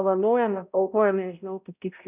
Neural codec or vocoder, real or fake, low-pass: codec, 16 kHz, 0.5 kbps, X-Codec, HuBERT features, trained on balanced general audio; fake; 3.6 kHz